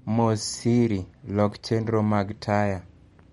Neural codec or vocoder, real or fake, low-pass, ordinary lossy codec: none; real; 19.8 kHz; MP3, 48 kbps